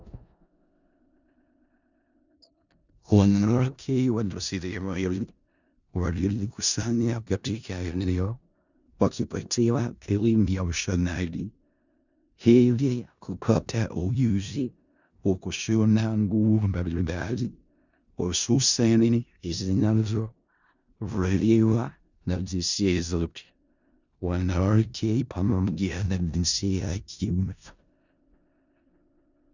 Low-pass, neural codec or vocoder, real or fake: 7.2 kHz; codec, 16 kHz in and 24 kHz out, 0.4 kbps, LongCat-Audio-Codec, four codebook decoder; fake